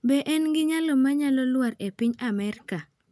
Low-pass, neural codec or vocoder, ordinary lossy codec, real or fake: none; none; none; real